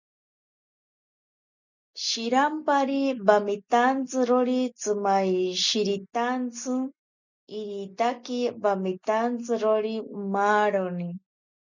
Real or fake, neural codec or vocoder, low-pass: real; none; 7.2 kHz